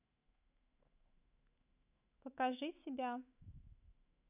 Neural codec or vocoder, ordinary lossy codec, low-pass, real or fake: autoencoder, 48 kHz, 128 numbers a frame, DAC-VAE, trained on Japanese speech; none; 3.6 kHz; fake